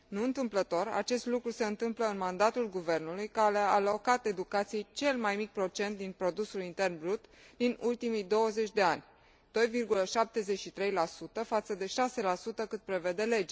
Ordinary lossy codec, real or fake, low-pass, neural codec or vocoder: none; real; none; none